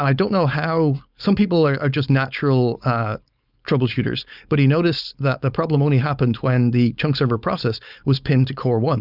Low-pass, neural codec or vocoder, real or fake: 5.4 kHz; codec, 16 kHz, 4.8 kbps, FACodec; fake